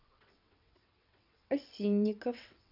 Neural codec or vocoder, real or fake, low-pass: vocoder, 22.05 kHz, 80 mel bands, WaveNeXt; fake; 5.4 kHz